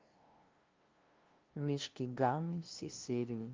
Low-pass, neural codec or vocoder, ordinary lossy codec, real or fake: 7.2 kHz; codec, 16 kHz, 0.5 kbps, FunCodec, trained on LibriTTS, 25 frames a second; Opus, 32 kbps; fake